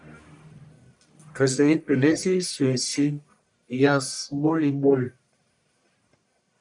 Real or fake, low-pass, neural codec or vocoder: fake; 10.8 kHz; codec, 44.1 kHz, 1.7 kbps, Pupu-Codec